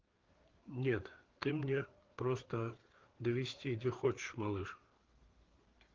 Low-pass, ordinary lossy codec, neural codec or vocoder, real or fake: 7.2 kHz; Opus, 16 kbps; codec, 16 kHz, 4 kbps, FreqCodec, larger model; fake